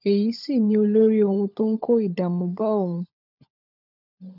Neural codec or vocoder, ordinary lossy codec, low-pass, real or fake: codec, 16 kHz, 16 kbps, FunCodec, trained on LibriTTS, 50 frames a second; none; 5.4 kHz; fake